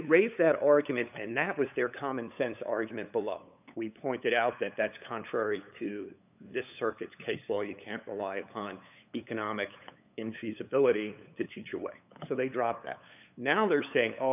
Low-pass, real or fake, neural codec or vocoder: 3.6 kHz; fake; codec, 16 kHz, 8 kbps, FunCodec, trained on LibriTTS, 25 frames a second